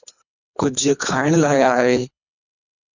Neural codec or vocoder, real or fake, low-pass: codec, 24 kHz, 3 kbps, HILCodec; fake; 7.2 kHz